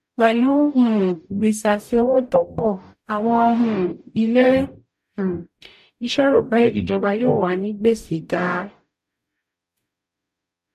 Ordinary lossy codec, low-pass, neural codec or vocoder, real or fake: MP3, 64 kbps; 14.4 kHz; codec, 44.1 kHz, 0.9 kbps, DAC; fake